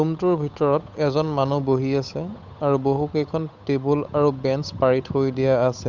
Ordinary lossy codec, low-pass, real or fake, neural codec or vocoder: none; 7.2 kHz; fake; codec, 16 kHz, 8 kbps, FunCodec, trained on Chinese and English, 25 frames a second